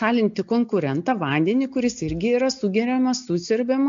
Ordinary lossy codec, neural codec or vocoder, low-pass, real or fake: MP3, 48 kbps; none; 7.2 kHz; real